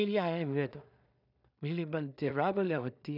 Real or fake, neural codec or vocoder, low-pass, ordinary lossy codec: fake; codec, 16 kHz in and 24 kHz out, 0.4 kbps, LongCat-Audio-Codec, two codebook decoder; 5.4 kHz; none